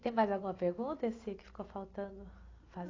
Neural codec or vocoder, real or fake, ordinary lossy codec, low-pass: none; real; AAC, 32 kbps; 7.2 kHz